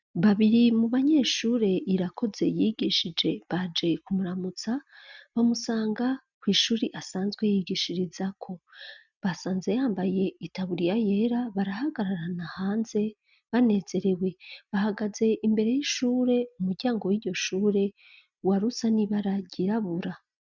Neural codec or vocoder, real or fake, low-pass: none; real; 7.2 kHz